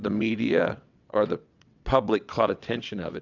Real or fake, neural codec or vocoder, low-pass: fake; vocoder, 22.05 kHz, 80 mel bands, WaveNeXt; 7.2 kHz